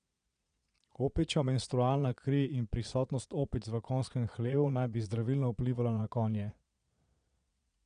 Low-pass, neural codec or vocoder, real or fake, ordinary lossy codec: 9.9 kHz; vocoder, 22.05 kHz, 80 mel bands, Vocos; fake; none